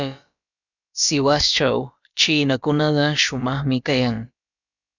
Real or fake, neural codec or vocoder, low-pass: fake; codec, 16 kHz, about 1 kbps, DyCAST, with the encoder's durations; 7.2 kHz